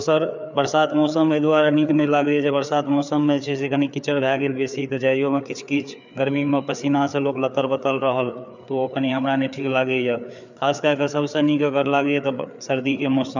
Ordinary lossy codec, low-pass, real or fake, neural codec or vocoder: none; 7.2 kHz; fake; codec, 16 kHz, 4 kbps, FreqCodec, larger model